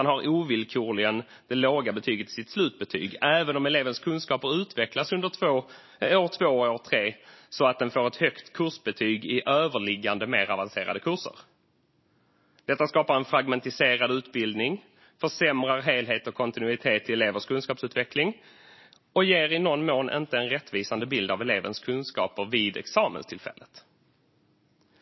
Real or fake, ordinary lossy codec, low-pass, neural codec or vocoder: real; MP3, 24 kbps; 7.2 kHz; none